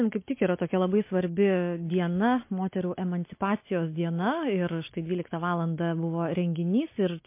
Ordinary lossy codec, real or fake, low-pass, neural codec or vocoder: MP3, 24 kbps; real; 3.6 kHz; none